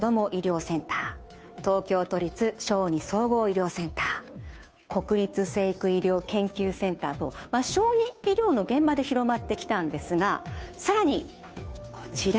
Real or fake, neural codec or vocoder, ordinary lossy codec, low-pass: fake; codec, 16 kHz, 2 kbps, FunCodec, trained on Chinese and English, 25 frames a second; none; none